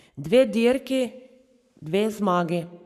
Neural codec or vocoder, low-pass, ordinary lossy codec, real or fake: codec, 44.1 kHz, 7.8 kbps, Pupu-Codec; 14.4 kHz; none; fake